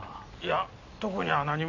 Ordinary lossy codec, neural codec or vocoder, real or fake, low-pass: none; none; real; 7.2 kHz